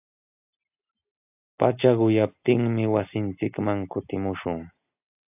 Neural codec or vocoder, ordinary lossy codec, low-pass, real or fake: none; AAC, 32 kbps; 3.6 kHz; real